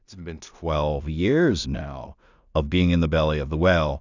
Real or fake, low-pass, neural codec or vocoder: fake; 7.2 kHz; codec, 16 kHz in and 24 kHz out, 0.4 kbps, LongCat-Audio-Codec, two codebook decoder